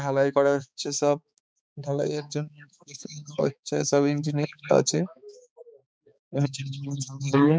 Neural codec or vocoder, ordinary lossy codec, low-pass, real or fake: codec, 16 kHz, 4 kbps, X-Codec, HuBERT features, trained on balanced general audio; none; none; fake